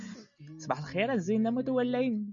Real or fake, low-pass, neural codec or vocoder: real; 7.2 kHz; none